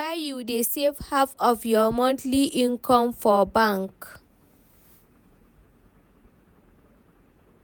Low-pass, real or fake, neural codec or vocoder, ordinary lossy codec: none; fake; vocoder, 48 kHz, 128 mel bands, Vocos; none